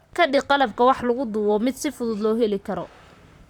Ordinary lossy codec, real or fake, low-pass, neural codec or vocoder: none; fake; 19.8 kHz; vocoder, 44.1 kHz, 128 mel bands, Pupu-Vocoder